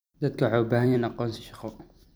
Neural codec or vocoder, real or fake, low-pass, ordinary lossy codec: vocoder, 44.1 kHz, 128 mel bands every 256 samples, BigVGAN v2; fake; none; none